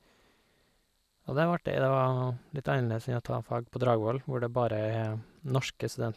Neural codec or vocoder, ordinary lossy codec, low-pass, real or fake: vocoder, 44.1 kHz, 128 mel bands every 512 samples, BigVGAN v2; none; 14.4 kHz; fake